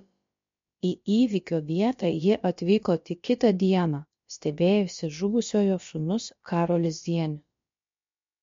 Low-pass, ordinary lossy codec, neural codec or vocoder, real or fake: 7.2 kHz; MP3, 48 kbps; codec, 16 kHz, about 1 kbps, DyCAST, with the encoder's durations; fake